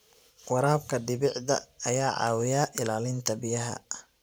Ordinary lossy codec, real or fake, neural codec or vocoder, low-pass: none; fake; vocoder, 44.1 kHz, 128 mel bands every 512 samples, BigVGAN v2; none